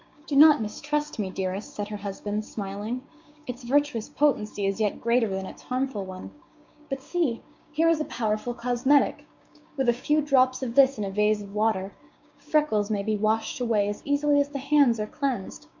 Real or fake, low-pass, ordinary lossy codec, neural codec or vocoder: fake; 7.2 kHz; MP3, 64 kbps; codec, 16 kHz, 6 kbps, DAC